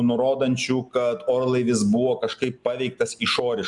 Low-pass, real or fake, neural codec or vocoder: 10.8 kHz; real; none